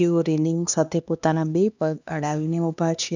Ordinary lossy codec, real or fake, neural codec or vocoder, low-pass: none; fake; codec, 16 kHz, 2 kbps, X-Codec, HuBERT features, trained on LibriSpeech; 7.2 kHz